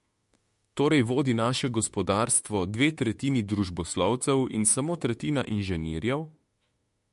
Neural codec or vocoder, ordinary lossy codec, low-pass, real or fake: autoencoder, 48 kHz, 32 numbers a frame, DAC-VAE, trained on Japanese speech; MP3, 48 kbps; 14.4 kHz; fake